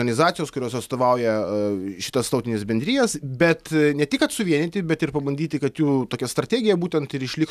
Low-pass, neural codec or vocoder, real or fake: 14.4 kHz; none; real